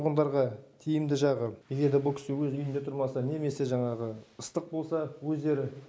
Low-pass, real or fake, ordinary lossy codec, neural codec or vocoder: none; real; none; none